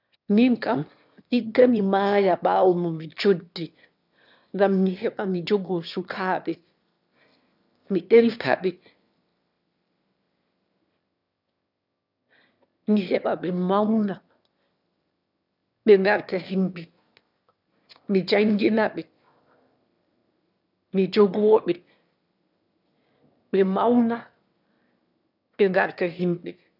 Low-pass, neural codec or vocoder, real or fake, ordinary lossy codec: 5.4 kHz; autoencoder, 22.05 kHz, a latent of 192 numbers a frame, VITS, trained on one speaker; fake; none